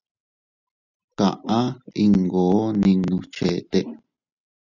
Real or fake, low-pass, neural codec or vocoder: real; 7.2 kHz; none